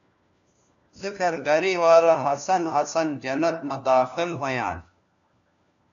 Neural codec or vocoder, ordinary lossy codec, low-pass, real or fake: codec, 16 kHz, 1 kbps, FunCodec, trained on LibriTTS, 50 frames a second; MP3, 64 kbps; 7.2 kHz; fake